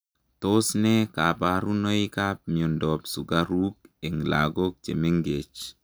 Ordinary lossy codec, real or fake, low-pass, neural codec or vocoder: none; real; none; none